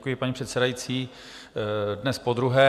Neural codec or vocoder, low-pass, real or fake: none; 14.4 kHz; real